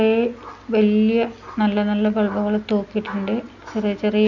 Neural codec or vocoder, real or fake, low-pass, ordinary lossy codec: none; real; 7.2 kHz; none